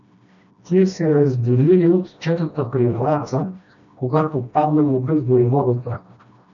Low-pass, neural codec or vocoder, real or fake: 7.2 kHz; codec, 16 kHz, 1 kbps, FreqCodec, smaller model; fake